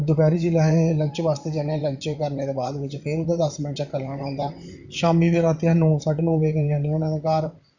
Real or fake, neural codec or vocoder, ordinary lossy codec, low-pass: fake; vocoder, 44.1 kHz, 128 mel bands, Pupu-Vocoder; none; 7.2 kHz